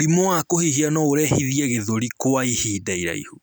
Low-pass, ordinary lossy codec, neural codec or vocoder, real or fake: none; none; none; real